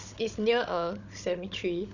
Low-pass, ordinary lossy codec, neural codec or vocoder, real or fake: 7.2 kHz; none; codec, 16 kHz, 16 kbps, FunCodec, trained on Chinese and English, 50 frames a second; fake